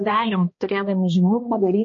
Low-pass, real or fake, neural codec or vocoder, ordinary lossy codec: 7.2 kHz; fake; codec, 16 kHz, 1 kbps, X-Codec, HuBERT features, trained on balanced general audio; MP3, 32 kbps